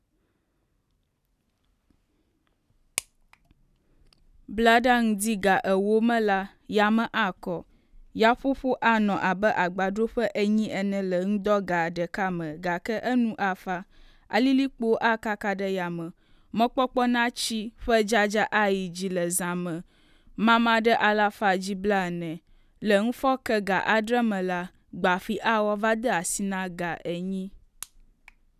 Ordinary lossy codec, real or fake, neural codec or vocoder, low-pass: none; real; none; 14.4 kHz